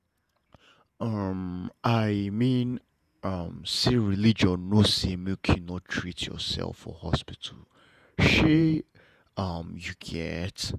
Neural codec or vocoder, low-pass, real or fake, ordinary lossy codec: vocoder, 48 kHz, 128 mel bands, Vocos; 14.4 kHz; fake; none